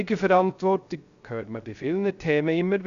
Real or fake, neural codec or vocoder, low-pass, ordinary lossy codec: fake; codec, 16 kHz, 0.3 kbps, FocalCodec; 7.2 kHz; Opus, 64 kbps